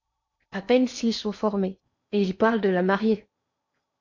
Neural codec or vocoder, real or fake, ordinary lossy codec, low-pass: codec, 16 kHz in and 24 kHz out, 0.8 kbps, FocalCodec, streaming, 65536 codes; fake; MP3, 48 kbps; 7.2 kHz